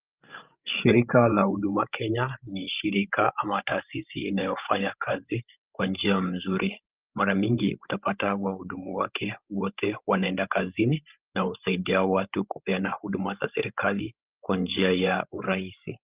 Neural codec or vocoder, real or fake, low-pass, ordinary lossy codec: codec, 16 kHz, 16 kbps, FreqCodec, larger model; fake; 3.6 kHz; Opus, 24 kbps